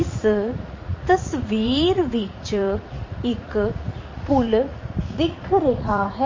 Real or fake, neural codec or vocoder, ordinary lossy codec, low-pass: fake; vocoder, 44.1 kHz, 80 mel bands, Vocos; MP3, 32 kbps; 7.2 kHz